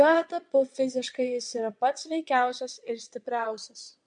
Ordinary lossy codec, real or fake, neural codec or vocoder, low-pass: MP3, 64 kbps; fake; vocoder, 22.05 kHz, 80 mel bands, WaveNeXt; 9.9 kHz